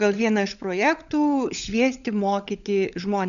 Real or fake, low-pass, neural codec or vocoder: fake; 7.2 kHz; codec, 16 kHz, 16 kbps, FunCodec, trained on LibriTTS, 50 frames a second